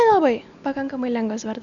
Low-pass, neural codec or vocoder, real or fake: 7.2 kHz; none; real